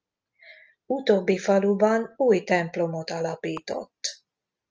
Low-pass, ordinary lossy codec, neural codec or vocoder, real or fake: 7.2 kHz; Opus, 24 kbps; none; real